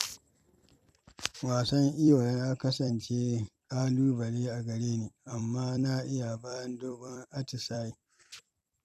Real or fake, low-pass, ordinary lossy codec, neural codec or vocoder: fake; 14.4 kHz; none; vocoder, 44.1 kHz, 128 mel bands every 256 samples, BigVGAN v2